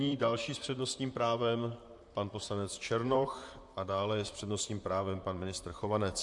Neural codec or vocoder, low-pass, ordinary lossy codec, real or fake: vocoder, 44.1 kHz, 128 mel bands, Pupu-Vocoder; 10.8 kHz; MP3, 64 kbps; fake